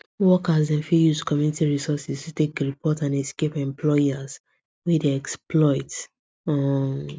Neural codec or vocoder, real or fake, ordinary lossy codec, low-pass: none; real; none; none